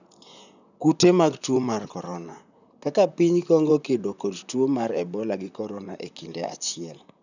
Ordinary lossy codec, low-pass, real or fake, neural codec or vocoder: none; 7.2 kHz; fake; vocoder, 44.1 kHz, 128 mel bands, Pupu-Vocoder